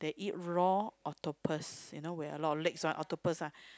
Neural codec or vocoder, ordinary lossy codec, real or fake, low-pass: none; none; real; none